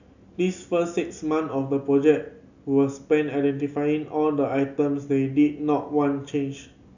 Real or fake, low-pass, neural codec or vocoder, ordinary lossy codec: real; 7.2 kHz; none; none